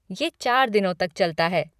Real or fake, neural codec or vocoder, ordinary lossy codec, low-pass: fake; vocoder, 44.1 kHz, 128 mel bands every 512 samples, BigVGAN v2; none; 14.4 kHz